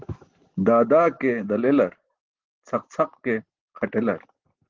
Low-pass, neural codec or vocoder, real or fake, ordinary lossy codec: 7.2 kHz; none; real; Opus, 16 kbps